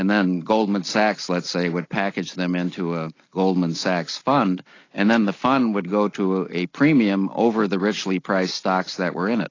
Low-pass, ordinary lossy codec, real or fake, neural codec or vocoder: 7.2 kHz; AAC, 32 kbps; real; none